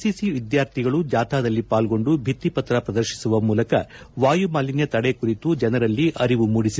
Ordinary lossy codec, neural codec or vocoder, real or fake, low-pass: none; none; real; none